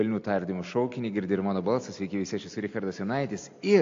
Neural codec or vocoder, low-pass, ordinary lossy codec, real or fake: none; 7.2 kHz; AAC, 48 kbps; real